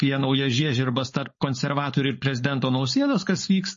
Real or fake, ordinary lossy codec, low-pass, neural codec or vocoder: fake; MP3, 32 kbps; 7.2 kHz; codec, 16 kHz, 4.8 kbps, FACodec